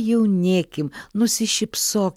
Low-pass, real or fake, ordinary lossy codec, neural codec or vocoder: 19.8 kHz; real; MP3, 96 kbps; none